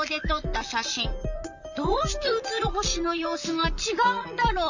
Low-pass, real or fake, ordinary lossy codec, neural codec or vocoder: 7.2 kHz; fake; none; vocoder, 44.1 kHz, 128 mel bands, Pupu-Vocoder